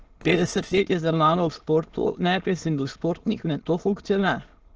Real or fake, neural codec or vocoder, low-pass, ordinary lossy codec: fake; autoencoder, 22.05 kHz, a latent of 192 numbers a frame, VITS, trained on many speakers; 7.2 kHz; Opus, 16 kbps